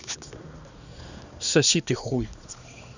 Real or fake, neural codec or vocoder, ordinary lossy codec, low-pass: fake; codec, 16 kHz, 2 kbps, X-Codec, HuBERT features, trained on balanced general audio; none; 7.2 kHz